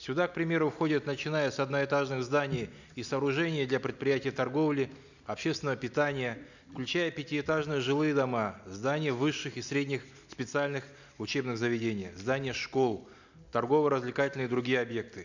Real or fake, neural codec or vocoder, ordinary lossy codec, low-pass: real; none; none; 7.2 kHz